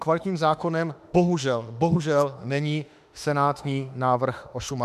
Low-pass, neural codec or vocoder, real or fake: 14.4 kHz; autoencoder, 48 kHz, 32 numbers a frame, DAC-VAE, trained on Japanese speech; fake